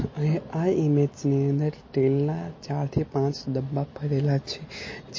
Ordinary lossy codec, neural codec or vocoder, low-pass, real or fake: MP3, 32 kbps; none; 7.2 kHz; real